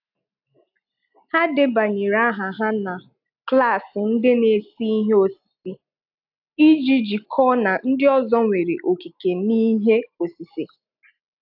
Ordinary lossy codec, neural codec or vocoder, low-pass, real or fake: AAC, 48 kbps; none; 5.4 kHz; real